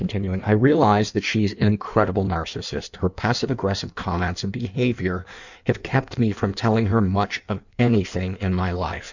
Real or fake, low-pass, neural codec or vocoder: fake; 7.2 kHz; codec, 16 kHz in and 24 kHz out, 1.1 kbps, FireRedTTS-2 codec